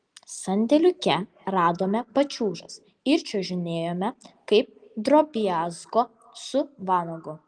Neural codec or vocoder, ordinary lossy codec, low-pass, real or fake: none; Opus, 24 kbps; 9.9 kHz; real